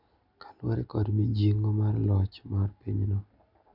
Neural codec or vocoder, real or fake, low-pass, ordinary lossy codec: none; real; 5.4 kHz; none